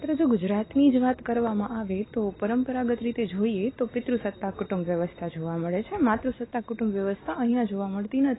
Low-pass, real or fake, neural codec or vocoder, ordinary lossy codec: 7.2 kHz; real; none; AAC, 16 kbps